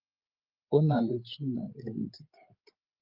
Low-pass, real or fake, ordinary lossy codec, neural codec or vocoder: 5.4 kHz; fake; Opus, 32 kbps; codec, 16 kHz, 8 kbps, FreqCodec, larger model